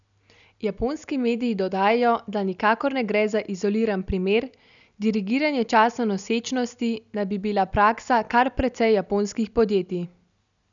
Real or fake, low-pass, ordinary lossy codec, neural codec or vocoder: real; 7.2 kHz; none; none